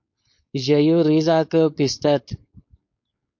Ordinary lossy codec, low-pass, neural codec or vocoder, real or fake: MP3, 48 kbps; 7.2 kHz; codec, 16 kHz, 4.8 kbps, FACodec; fake